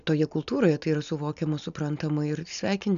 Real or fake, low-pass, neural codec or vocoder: real; 7.2 kHz; none